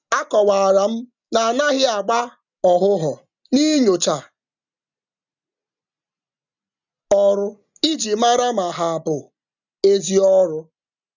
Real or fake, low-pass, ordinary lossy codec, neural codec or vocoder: real; 7.2 kHz; none; none